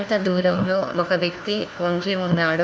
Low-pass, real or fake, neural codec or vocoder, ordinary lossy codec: none; fake; codec, 16 kHz, 1 kbps, FunCodec, trained on LibriTTS, 50 frames a second; none